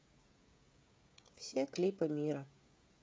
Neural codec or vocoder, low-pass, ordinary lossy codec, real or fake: codec, 16 kHz, 16 kbps, FreqCodec, smaller model; none; none; fake